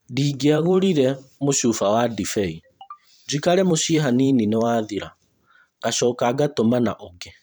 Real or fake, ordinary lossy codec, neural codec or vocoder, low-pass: fake; none; vocoder, 44.1 kHz, 128 mel bands every 512 samples, BigVGAN v2; none